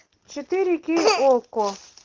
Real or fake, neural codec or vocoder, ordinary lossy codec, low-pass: real; none; Opus, 16 kbps; 7.2 kHz